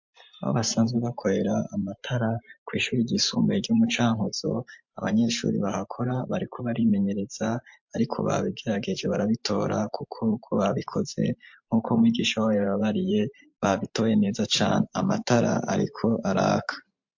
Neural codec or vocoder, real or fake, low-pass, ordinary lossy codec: vocoder, 44.1 kHz, 128 mel bands every 512 samples, BigVGAN v2; fake; 7.2 kHz; MP3, 48 kbps